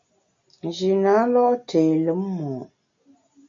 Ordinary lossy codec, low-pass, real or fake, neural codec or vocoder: MP3, 48 kbps; 7.2 kHz; real; none